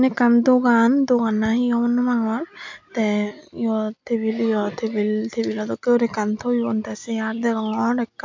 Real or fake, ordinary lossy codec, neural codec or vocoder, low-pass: real; MP3, 64 kbps; none; 7.2 kHz